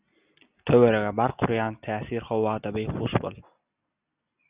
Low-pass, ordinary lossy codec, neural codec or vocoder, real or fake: 3.6 kHz; Opus, 64 kbps; none; real